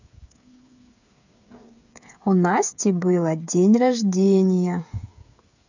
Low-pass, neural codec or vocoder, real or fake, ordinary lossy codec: 7.2 kHz; codec, 16 kHz, 8 kbps, FreqCodec, smaller model; fake; none